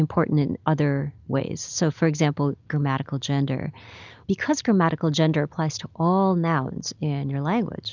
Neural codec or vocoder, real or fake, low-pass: none; real; 7.2 kHz